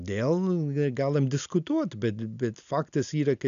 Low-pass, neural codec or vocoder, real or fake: 7.2 kHz; none; real